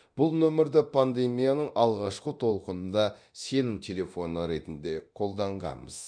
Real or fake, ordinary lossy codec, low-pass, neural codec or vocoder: fake; none; 9.9 kHz; codec, 24 kHz, 0.9 kbps, DualCodec